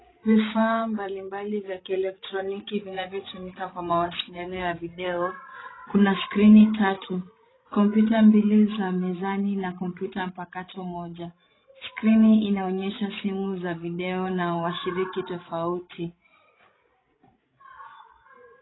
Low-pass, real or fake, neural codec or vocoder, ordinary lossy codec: 7.2 kHz; fake; codec, 16 kHz, 16 kbps, FreqCodec, larger model; AAC, 16 kbps